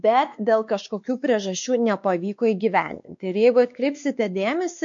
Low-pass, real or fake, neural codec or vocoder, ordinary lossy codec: 7.2 kHz; fake; codec, 16 kHz, 2 kbps, X-Codec, WavLM features, trained on Multilingual LibriSpeech; MP3, 48 kbps